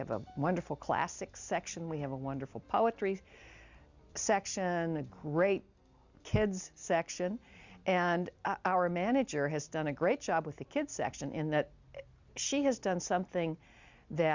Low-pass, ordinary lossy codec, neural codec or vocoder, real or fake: 7.2 kHz; Opus, 64 kbps; none; real